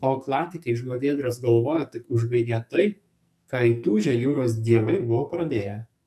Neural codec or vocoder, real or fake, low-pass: codec, 44.1 kHz, 2.6 kbps, SNAC; fake; 14.4 kHz